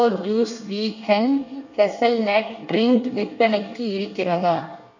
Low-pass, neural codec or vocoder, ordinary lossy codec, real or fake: 7.2 kHz; codec, 24 kHz, 1 kbps, SNAC; none; fake